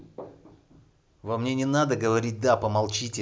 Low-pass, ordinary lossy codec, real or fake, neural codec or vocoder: none; none; real; none